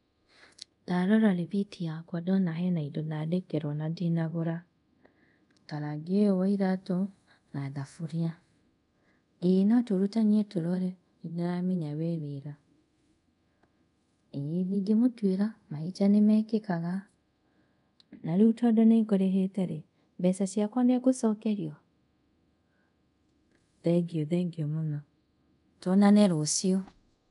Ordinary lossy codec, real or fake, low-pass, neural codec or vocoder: none; fake; 10.8 kHz; codec, 24 kHz, 0.5 kbps, DualCodec